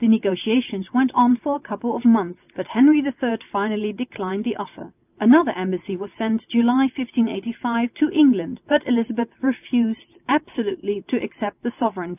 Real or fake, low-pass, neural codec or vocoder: real; 3.6 kHz; none